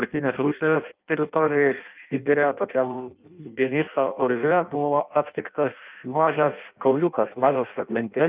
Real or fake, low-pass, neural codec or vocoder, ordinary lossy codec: fake; 3.6 kHz; codec, 16 kHz in and 24 kHz out, 0.6 kbps, FireRedTTS-2 codec; Opus, 24 kbps